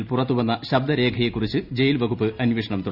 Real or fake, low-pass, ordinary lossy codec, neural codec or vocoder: real; 5.4 kHz; none; none